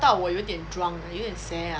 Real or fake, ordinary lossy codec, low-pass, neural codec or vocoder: real; none; none; none